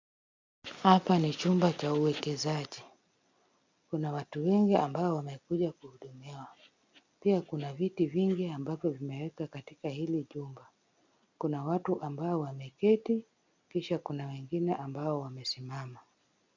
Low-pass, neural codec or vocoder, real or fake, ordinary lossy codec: 7.2 kHz; none; real; MP3, 64 kbps